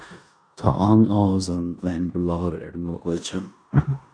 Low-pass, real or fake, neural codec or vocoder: 9.9 kHz; fake; codec, 16 kHz in and 24 kHz out, 0.9 kbps, LongCat-Audio-Codec, four codebook decoder